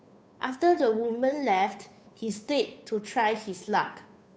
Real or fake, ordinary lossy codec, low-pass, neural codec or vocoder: fake; none; none; codec, 16 kHz, 2 kbps, FunCodec, trained on Chinese and English, 25 frames a second